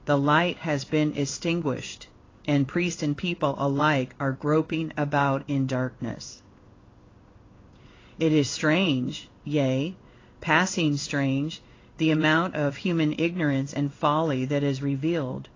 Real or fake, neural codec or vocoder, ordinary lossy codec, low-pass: fake; codec, 16 kHz in and 24 kHz out, 1 kbps, XY-Tokenizer; AAC, 32 kbps; 7.2 kHz